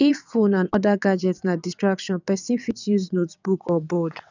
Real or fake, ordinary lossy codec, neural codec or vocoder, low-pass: fake; none; vocoder, 22.05 kHz, 80 mel bands, WaveNeXt; 7.2 kHz